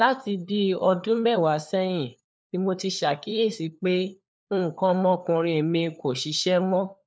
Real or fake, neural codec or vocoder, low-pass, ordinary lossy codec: fake; codec, 16 kHz, 4 kbps, FunCodec, trained on LibriTTS, 50 frames a second; none; none